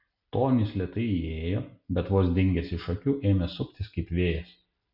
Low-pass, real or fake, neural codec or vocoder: 5.4 kHz; real; none